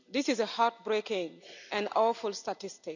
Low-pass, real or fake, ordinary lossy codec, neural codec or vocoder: 7.2 kHz; real; none; none